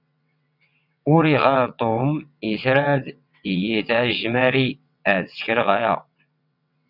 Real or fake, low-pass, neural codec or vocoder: fake; 5.4 kHz; vocoder, 22.05 kHz, 80 mel bands, WaveNeXt